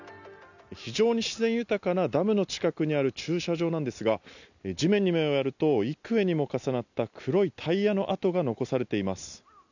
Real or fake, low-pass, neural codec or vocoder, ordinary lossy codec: real; 7.2 kHz; none; none